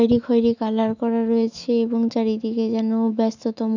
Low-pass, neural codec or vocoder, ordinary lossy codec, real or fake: 7.2 kHz; autoencoder, 48 kHz, 128 numbers a frame, DAC-VAE, trained on Japanese speech; none; fake